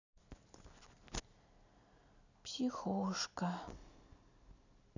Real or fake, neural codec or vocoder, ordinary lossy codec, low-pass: real; none; none; 7.2 kHz